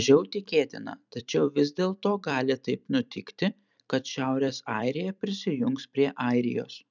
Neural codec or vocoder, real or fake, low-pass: vocoder, 44.1 kHz, 128 mel bands every 256 samples, BigVGAN v2; fake; 7.2 kHz